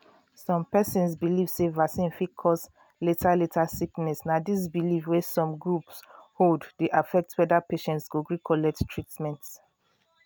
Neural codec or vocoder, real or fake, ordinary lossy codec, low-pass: none; real; none; none